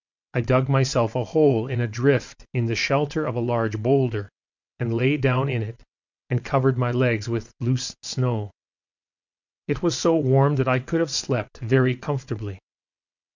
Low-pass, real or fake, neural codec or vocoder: 7.2 kHz; fake; vocoder, 22.05 kHz, 80 mel bands, WaveNeXt